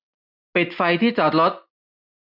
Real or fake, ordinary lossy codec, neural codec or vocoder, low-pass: real; none; none; 5.4 kHz